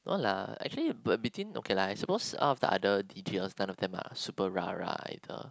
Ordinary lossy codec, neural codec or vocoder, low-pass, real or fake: none; none; none; real